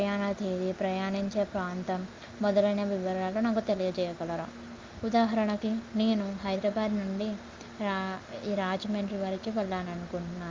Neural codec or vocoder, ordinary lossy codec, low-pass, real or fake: none; Opus, 32 kbps; 7.2 kHz; real